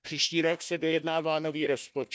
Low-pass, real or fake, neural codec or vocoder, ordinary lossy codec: none; fake; codec, 16 kHz, 1 kbps, FunCodec, trained on Chinese and English, 50 frames a second; none